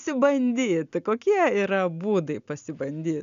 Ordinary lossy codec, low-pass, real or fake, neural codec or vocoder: MP3, 96 kbps; 7.2 kHz; real; none